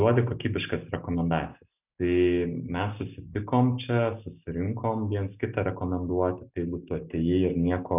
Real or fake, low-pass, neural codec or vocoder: real; 3.6 kHz; none